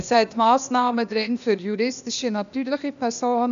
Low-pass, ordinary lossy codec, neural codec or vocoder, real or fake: 7.2 kHz; none; codec, 16 kHz, 0.8 kbps, ZipCodec; fake